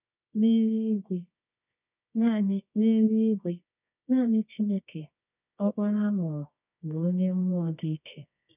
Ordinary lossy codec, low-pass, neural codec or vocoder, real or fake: none; 3.6 kHz; codec, 24 kHz, 0.9 kbps, WavTokenizer, medium music audio release; fake